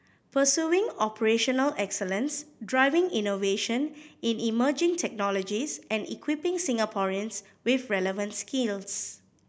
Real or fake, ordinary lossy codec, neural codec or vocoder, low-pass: real; none; none; none